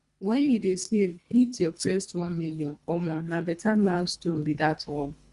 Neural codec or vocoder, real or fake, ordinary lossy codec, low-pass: codec, 24 kHz, 1.5 kbps, HILCodec; fake; Opus, 64 kbps; 10.8 kHz